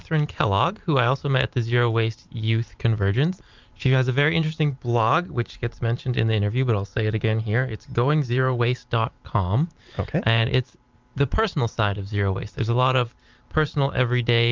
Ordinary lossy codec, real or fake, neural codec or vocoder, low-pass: Opus, 32 kbps; fake; vocoder, 44.1 kHz, 128 mel bands every 512 samples, BigVGAN v2; 7.2 kHz